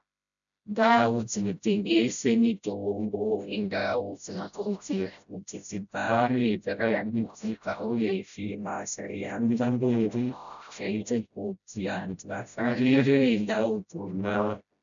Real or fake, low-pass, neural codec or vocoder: fake; 7.2 kHz; codec, 16 kHz, 0.5 kbps, FreqCodec, smaller model